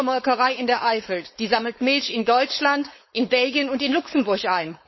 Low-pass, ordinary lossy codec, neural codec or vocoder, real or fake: 7.2 kHz; MP3, 24 kbps; codec, 16 kHz, 4.8 kbps, FACodec; fake